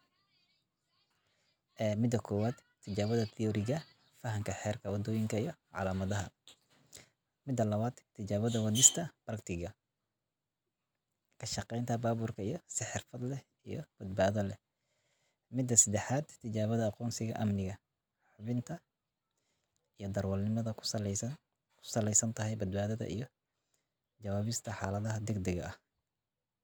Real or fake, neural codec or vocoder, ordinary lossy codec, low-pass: real; none; none; none